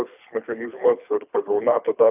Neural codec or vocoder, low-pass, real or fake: codec, 24 kHz, 3 kbps, HILCodec; 3.6 kHz; fake